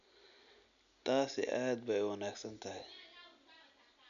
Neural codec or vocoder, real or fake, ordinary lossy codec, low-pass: none; real; none; 7.2 kHz